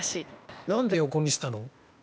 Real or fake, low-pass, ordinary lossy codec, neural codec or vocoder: fake; none; none; codec, 16 kHz, 0.8 kbps, ZipCodec